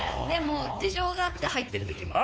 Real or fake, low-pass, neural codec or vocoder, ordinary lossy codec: fake; none; codec, 16 kHz, 4 kbps, X-Codec, WavLM features, trained on Multilingual LibriSpeech; none